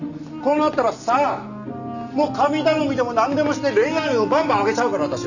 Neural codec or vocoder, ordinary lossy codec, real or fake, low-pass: none; none; real; 7.2 kHz